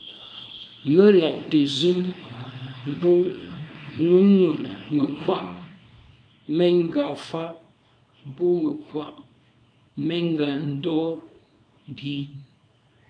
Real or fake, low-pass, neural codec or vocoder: fake; 9.9 kHz; codec, 24 kHz, 0.9 kbps, WavTokenizer, small release